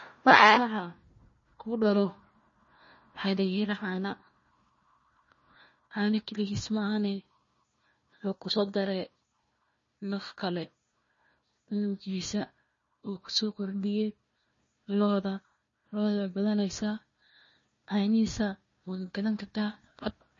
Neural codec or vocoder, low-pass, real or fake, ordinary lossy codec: codec, 16 kHz, 1 kbps, FunCodec, trained on Chinese and English, 50 frames a second; 7.2 kHz; fake; MP3, 32 kbps